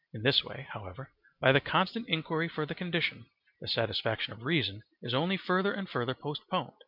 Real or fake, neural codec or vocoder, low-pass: real; none; 5.4 kHz